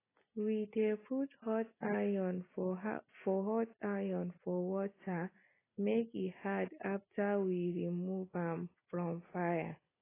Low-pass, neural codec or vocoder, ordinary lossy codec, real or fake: 3.6 kHz; none; AAC, 16 kbps; real